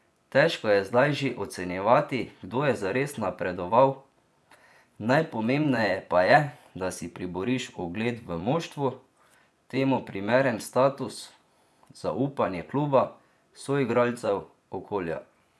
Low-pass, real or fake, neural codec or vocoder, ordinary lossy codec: none; fake; vocoder, 24 kHz, 100 mel bands, Vocos; none